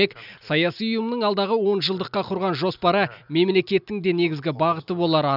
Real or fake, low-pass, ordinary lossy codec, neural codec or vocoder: real; 5.4 kHz; none; none